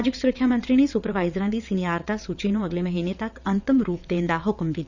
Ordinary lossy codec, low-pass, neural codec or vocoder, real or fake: none; 7.2 kHz; vocoder, 22.05 kHz, 80 mel bands, WaveNeXt; fake